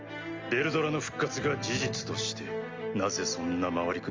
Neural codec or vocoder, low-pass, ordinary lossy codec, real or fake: none; 7.2 kHz; Opus, 32 kbps; real